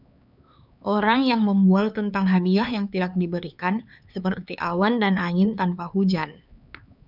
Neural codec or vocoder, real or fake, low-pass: codec, 16 kHz, 4 kbps, X-Codec, HuBERT features, trained on LibriSpeech; fake; 5.4 kHz